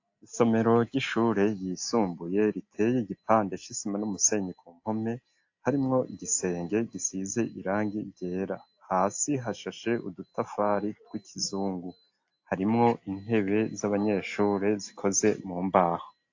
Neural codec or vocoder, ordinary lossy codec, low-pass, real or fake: none; AAC, 48 kbps; 7.2 kHz; real